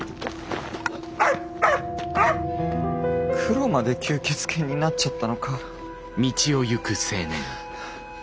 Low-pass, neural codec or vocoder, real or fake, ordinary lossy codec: none; none; real; none